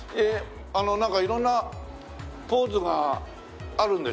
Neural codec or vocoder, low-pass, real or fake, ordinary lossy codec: none; none; real; none